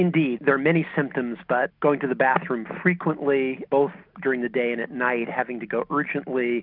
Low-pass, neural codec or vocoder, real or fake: 5.4 kHz; none; real